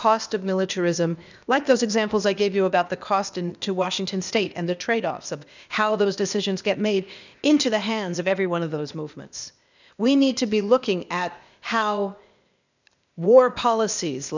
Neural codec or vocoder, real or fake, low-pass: codec, 16 kHz, 0.8 kbps, ZipCodec; fake; 7.2 kHz